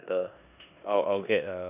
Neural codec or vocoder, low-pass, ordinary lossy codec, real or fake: codec, 16 kHz in and 24 kHz out, 0.9 kbps, LongCat-Audio-Codec, four codebook decoder; 3.6 kHz; none; fake